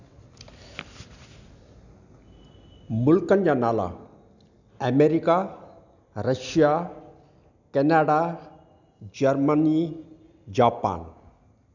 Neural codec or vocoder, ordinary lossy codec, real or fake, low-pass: none; none; real; 7.2 kHz